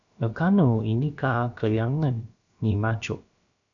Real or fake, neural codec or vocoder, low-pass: fake; codec, 16 kHz, about 1 kbps, DyCAST, with the encoder's durations; 7.2 kHz